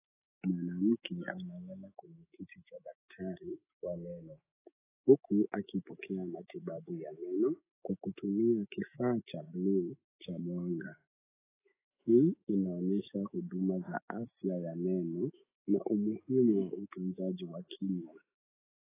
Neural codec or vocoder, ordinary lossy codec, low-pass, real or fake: autoencoder, 48 kHz, 128 numbers a frame, DAC-VAE, trained on Japanese speech; AAC, 16 kbps; 3.6 kHz; fake